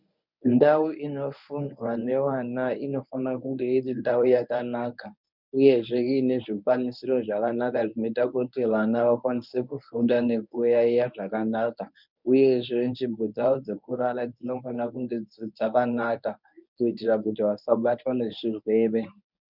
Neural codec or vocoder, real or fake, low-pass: codec, 24 kHz, 0.9 kbps, WavTokenizer, medium speech release version 1; fake; 5.4 kHz